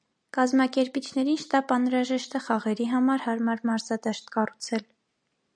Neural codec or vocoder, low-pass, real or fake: none; 9.9 kHz; real